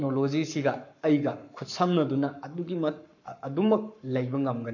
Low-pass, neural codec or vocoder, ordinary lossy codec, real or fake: 7.2 kHz; codec, 44.1 kHz, 7.8 kbps, Pupu-Codec; AAC, 48 kbps; fake